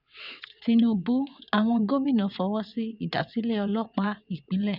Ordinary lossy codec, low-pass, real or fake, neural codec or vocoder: none; 5.4 kHz; fake; vocoder, 22.05 kHz, 80 mel bands, WaveNeXt